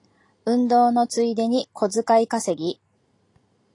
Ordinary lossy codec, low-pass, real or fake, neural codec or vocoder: AAC, 48 kbps; 10.8 kHz; real; none